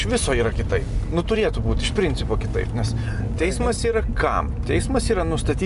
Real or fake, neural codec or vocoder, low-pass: real; none; 10.8 kHz